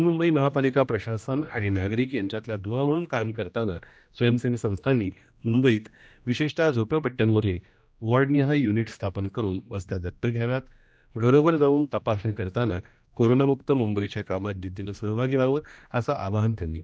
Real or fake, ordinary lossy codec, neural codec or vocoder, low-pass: fake; none; codec, 16 kHz, 1 kbps, X-Codec, HuBERT features, trained on general audio; none